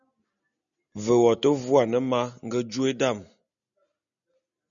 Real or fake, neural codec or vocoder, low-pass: real; none; 7.2 kHz